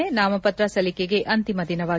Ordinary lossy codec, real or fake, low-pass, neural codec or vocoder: none; real; none; none